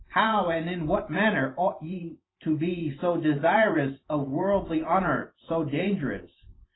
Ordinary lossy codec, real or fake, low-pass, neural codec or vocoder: AAC, 16 kbps; fake; 7.2 kHz; vocoder, 44.1 kHz, 128 mel bands every 512 samples, BigVGAN v2